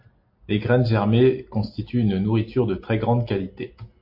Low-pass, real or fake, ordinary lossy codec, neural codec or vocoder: 5.4 kHz; real; MP3, 32 kbps; none